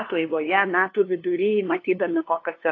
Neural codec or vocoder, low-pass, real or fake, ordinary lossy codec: codec, 16 kHz, 2 kbps, FunCodec, trained on LibriTTS, 25 frames a second; 7.2 kHz; fake; AAC, 32 kbps